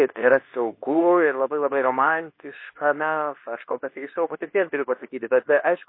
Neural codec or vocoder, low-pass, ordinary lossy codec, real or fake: codec, 24 kHz, 0.9 kbps, WavTokenizer, medium speech release version 2; 5.4 kHz; MP3, 24 kbps; fake